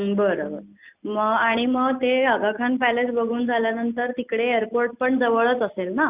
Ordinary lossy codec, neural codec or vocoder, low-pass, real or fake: Opus, 64 kbps; none; 3.6 kHz; real